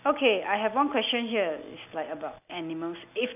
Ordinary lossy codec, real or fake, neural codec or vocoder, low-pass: none; real; none; 3.6 kHz